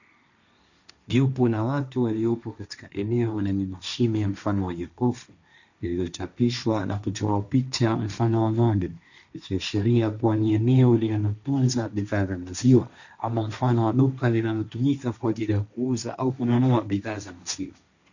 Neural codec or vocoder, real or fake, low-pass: codec, 16 kHz, 1.1 kbps, Voila-Tokenizer; fake; 7.2 kHz